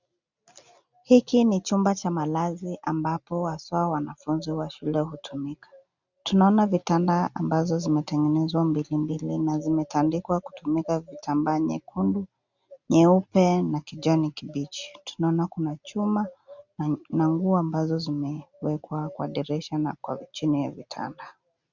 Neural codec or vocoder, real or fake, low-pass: none; real; 7.2 kHz